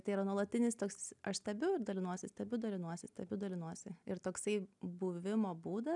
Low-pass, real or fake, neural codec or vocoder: 10.8 kHz; real; none